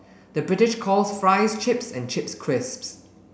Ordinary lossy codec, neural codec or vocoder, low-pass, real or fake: none; none; none; real